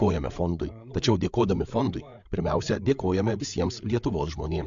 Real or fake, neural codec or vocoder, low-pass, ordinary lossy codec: fake; codec, 16 kHz, 8 kbps, FreqCodec, larger model; 7.2 kHz; MP3, 64 kbps